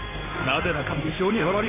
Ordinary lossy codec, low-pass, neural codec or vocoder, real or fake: MP3, 16 kbps; 3.6 kHz; codec, 16 kHz in and 24 kHz out, 1 kbps, XY-Tokenizer; fake